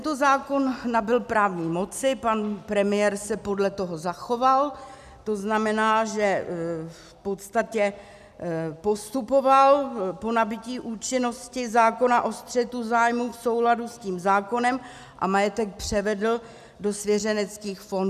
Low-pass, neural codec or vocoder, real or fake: 14.4 kHz; none; real